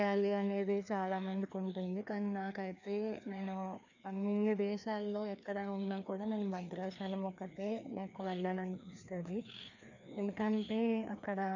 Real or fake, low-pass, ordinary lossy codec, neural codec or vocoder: fake; 7.2 kHz; none; codec, 16 kHz, 2 kbps, FreqCodec, larger model